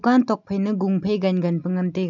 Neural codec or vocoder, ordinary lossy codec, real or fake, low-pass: none; none; real; 7.2 kHz